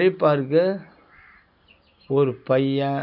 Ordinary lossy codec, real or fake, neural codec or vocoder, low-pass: none; real; none; 5.4 kHz